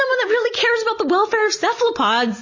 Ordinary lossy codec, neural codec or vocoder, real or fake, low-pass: MP3, 32 kbps; none; real; 7.2 kHz